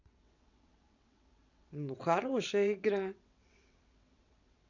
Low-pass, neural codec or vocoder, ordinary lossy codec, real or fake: 7.2 kHz; vocoder, 22.05 kHz, 80 mel bands, WaveNeXt; none; fake